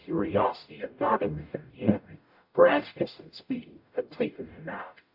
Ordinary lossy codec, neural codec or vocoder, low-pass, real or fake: AAC, 48 kbps; codec, 44.1 kHz, 0.9 kbps, DAC; 5.4 kHz; fake